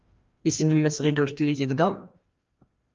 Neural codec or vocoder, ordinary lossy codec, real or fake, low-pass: codec, 16 kHz, 1 kbps, FreqCodec, larger model; Opus, 32 kbps; fake; 7.2 kHz